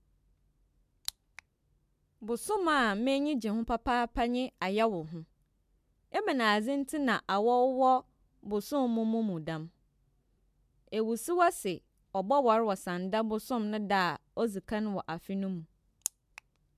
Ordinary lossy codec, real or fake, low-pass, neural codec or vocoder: MP3, 96 kbps; real; 14.4 kHz; none